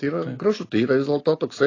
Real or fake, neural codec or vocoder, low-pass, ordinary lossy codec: real; none; 7.2 kHz; AAC, 32 kbps